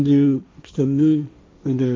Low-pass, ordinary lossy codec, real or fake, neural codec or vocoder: none; none; fake; codec, 16 kHz, 1.1 kbps, Voila-Tokenizer